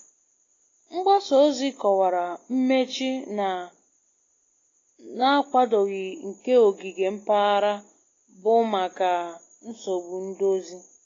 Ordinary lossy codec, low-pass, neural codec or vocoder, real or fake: AAC, 32 kbps; 7.2 kHz; none; real